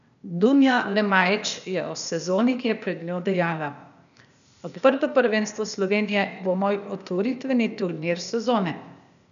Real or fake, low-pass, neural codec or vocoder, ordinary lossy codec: fake; 7.2 kHz; codec, 16 kHz, 0.8 kbps, ZipCodec; none